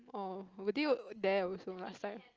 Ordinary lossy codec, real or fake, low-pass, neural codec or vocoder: Opus, 24 kbps; real; 7.2 kHz; none